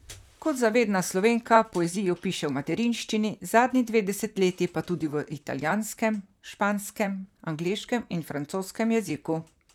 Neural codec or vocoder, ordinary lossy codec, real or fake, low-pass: vocoder, 44.1 kHz, 128 mel bands, Pupu-Vocoder; none; fake; 19.8 kHz